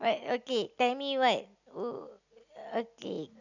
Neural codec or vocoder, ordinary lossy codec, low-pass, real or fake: none; none; 7.2 kHz; real